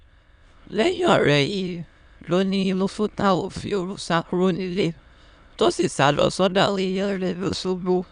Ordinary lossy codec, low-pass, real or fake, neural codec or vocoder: none; 9.9 kHz; fake; autoencoder, 22.05 kHz, a latent of 192 numbers a frame, VITS, trained on many speakers